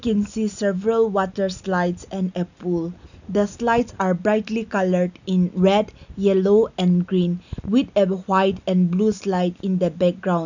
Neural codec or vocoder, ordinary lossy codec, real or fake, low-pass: none; none; real; 7.2 kHz